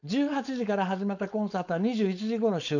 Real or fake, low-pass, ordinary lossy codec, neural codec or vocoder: fake; 7.2 kHz; none; codec, 16 kHz, 4.8 kbps, FACodec